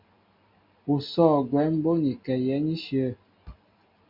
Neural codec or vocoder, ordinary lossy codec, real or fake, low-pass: none; MP3, 48 kbps; real; 5.4 kHz